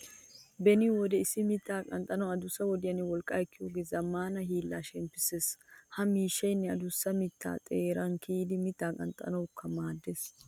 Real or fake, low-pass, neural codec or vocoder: real; 19.8 kHz; none